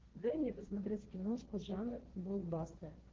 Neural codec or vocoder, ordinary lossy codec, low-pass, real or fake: codec, 16 kHz, 1.1 kbps, Voila-Tokenizer; Opus, 16 kbps; 7.2 kHz; fake